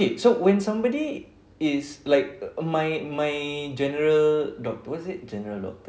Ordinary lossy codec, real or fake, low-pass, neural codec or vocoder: none; real; none; none